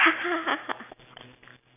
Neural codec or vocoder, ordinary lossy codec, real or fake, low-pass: none; none; real; 3.6 kHz